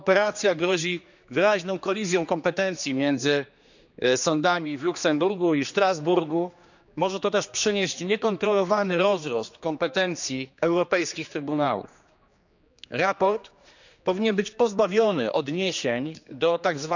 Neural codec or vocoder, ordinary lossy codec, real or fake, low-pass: codec, 16 kHz, 2 kbps, X-Codec, HuBERT features, trained on general audio; none; fake; 7.2 kHz